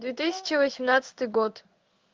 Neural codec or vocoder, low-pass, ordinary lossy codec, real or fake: codec, 16 kHz in and 24 kHz out, 1 kbps, XY-Tokenizer; 7.2 kHz; Opus, 32 kbps; fake